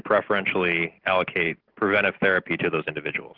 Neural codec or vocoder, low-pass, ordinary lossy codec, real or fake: none; 5.4 kHz; Opus, 16 kbps; real